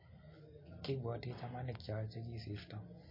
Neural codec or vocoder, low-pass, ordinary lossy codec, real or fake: none; 5.4 kHz; MP3, 24 kbps; real